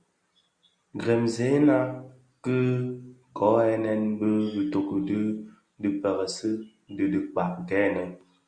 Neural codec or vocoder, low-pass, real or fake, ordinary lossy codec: none; 9.9 kHz; real; Opus, 64 kbps